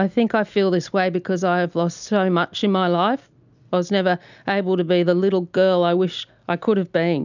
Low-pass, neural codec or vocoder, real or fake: 7.2 kHz; none; real